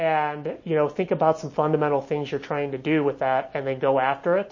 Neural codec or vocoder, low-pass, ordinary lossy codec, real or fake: none; 7.2 kHz; MP3, 32 kbps; real